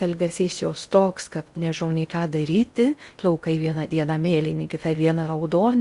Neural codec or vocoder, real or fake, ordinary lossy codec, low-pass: codec, 16 kHz in and 24 kHz out, 0.6 kbps, FocalCodec, streaming, 2048 codes; fake; Opus, 64 kbps; 10.8 kHz